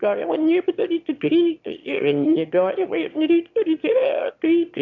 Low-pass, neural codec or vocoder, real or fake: 7.2 kHz; autoencoder, 22.05 kHz, a latent of 192 numbers a frame, VITS, trained on one speaker; fake